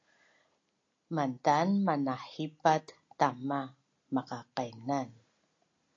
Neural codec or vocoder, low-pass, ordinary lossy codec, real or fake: none; 7.2 kHz; AAC, 48 kbps; real